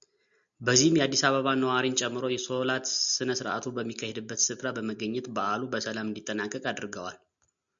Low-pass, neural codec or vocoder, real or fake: 7.2 kHz; none; real